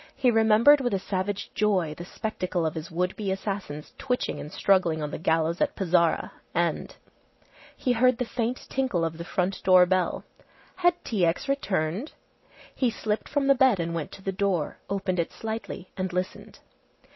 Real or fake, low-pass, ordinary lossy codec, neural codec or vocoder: real; 7.2 kHz; MP3, 24 kbps; none